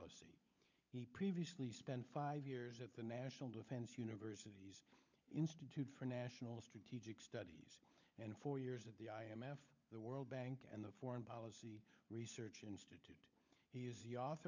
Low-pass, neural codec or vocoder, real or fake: 7.2 kHz; codec, 16 kHz, 16 kbps, FunCodec, trained on LibriTTS, 50 frames a second; fake